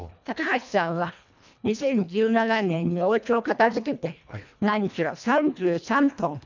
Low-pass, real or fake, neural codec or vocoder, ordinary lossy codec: 7.2 kHz; fake; codec, 24 kHz, 1.5 kbps, HILCodec; none